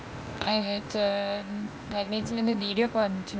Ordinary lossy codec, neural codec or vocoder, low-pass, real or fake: none; codec, 16 kHz, 0.8 kbps, ZipCodec; none; fake